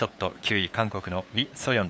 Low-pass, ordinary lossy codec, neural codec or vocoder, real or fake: none; none; codec, 16 kHz, 8 kbps, FunCodec, trained on LibriTTS, 25 frames a second; fake